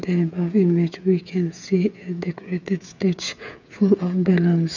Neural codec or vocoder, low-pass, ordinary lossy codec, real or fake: codec, 16 kHz, 8 kbps, FreqCodec, smaller model; 7.2 kHz; none; fake